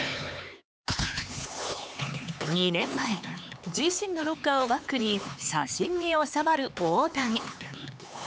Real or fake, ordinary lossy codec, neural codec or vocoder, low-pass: fake; none; codec, 16 kHz, 4 kbps, X-Codec, HuBERT features, trained on LibriSpeech; none